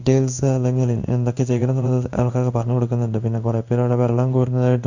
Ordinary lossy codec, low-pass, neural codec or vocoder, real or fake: none; 7.2 kHz; codec, 16 kHz in and 24 kHz out, 1 kbps, XY-Tokenizer; fake